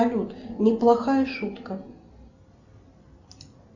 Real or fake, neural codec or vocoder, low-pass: real; none; 7.2 kHz